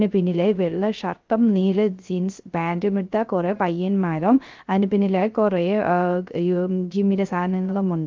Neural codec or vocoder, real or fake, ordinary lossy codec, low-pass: codec, 16 kHz, 0.3 kbps, FocalCodec; fake; Opus, 32 kbps; 7.2 kHz